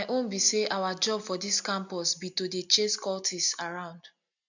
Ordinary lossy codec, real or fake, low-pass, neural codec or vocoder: none; real; 7.2 kHz; none